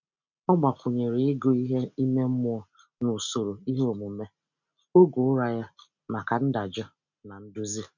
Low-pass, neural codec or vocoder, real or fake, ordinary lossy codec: 7.2 kHz; none; real; none